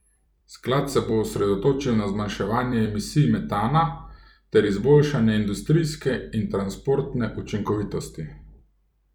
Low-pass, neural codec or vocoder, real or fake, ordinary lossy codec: 19.8 kHz; vocoder, 44.1 kHz, 128 mel bands every 256 samples, BigVGAN v2; fake; none